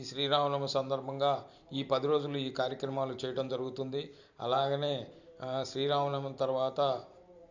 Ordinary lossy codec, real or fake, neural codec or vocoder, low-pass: none; fake; vocoder, 44.1 kHz, 128 mel bands every 512 samples, BigVGAN v2; 7.2 kHz